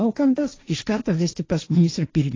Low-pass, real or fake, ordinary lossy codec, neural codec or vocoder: 7.2 kHz; fake; AAC, 32 kbps; codec, 16 kHz, 1.1 kbps, Voila-Tokenizer